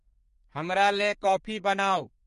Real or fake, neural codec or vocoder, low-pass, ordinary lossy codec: fake; codec, 32 kHz, 1.9 kbps, SNAC; 14.4 kHz; MP3, 48 kbps